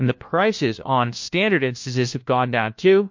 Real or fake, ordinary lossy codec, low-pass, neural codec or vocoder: fake; MP3, 48 kbps; 7.2 kHz; codec, 16 kHz, 1 kbps, FunCodec, trained on LibriTTS, 50 frames a second